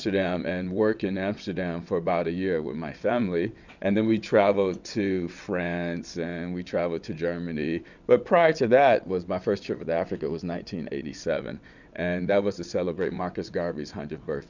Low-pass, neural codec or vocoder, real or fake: 7.2 kHz; vocoder, 22.05 kHz, 80 mel bands, WaveNeXt; fake